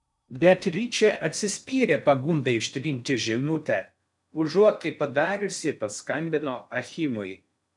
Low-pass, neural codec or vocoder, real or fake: 10.8 kHz; codec, 16 kHz in and 24 kHz out, 0.6 kbps, FocalCodec, streaming, 4096 codes; fake